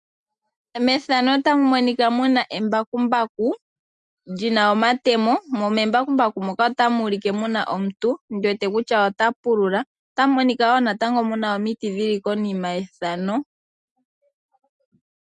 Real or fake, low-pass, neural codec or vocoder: real; 10.8 kHz; none